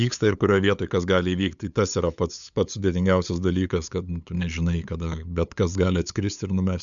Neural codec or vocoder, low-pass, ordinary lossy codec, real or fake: codec, 16 kHz, 8 kbps, FunCodec, trained on LibriTTS, 25 frames a second; 7.2 kHz; MP3, 96 kbps; fake